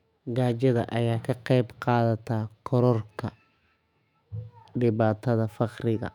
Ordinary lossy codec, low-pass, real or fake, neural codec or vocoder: none; 19.8 kHz; fake; autoencoder, 48 kHz, 128 numbers a frame, DAC-VAE, trained on Japanese speech